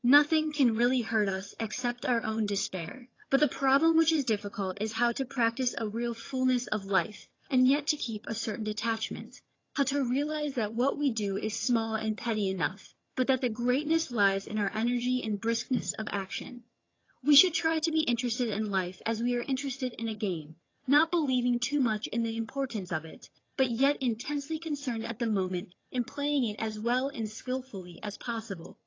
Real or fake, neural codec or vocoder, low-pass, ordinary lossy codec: fake; vocoder, 22.05 kHz, 80 mel bands, HiFi-GAN; 7.2 kHz; AAC, 32 kbps